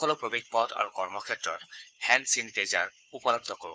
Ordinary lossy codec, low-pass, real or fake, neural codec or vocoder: none; none; fake; codec, 16 kHz, 4 kbps, FunCodec, trained on Chinese and English, 50 frames a second